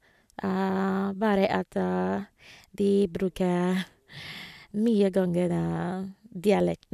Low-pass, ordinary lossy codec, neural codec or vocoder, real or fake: 14.4 kHz; none; none; real